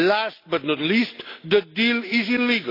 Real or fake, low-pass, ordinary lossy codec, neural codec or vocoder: real; 5.4 kHz; AAC, 32 kbps; none